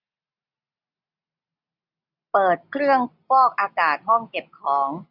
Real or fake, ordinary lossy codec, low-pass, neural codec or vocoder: real; none; 5.4 kHz; none